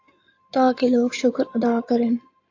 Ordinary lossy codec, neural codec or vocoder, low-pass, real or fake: AAC, 48 kbps; codec, 16 kHz in and 24 kHz out, 2.2 kbps, FireRedTTS-2 codec; 7.2 kHz; fake